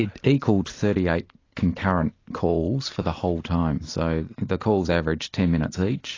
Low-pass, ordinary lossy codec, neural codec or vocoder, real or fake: 7.2 kHz; AAC, 32 kbps; none; real